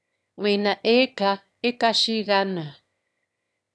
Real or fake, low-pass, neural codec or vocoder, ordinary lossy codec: fake; none; autoencoder, 22.05 kHz, a latent of 192 numbers a frame, VITS, trained on one speaker; none